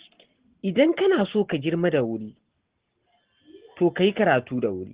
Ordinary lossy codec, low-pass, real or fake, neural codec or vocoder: Opus, 16 kbps; 3.6 kHz; real; none